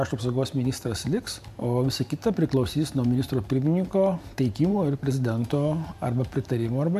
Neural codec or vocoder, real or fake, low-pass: vocoder, 44.1 kHz, 128 mel bands every 512 samples, BigVGAN v2; fake; 14.4 kHz